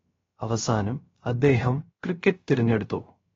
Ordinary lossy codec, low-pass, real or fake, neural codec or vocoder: AAC, 24 kbps; 7.2 kHz; fake; codec, 16 kHz, 0.3 kbps, FocalCodec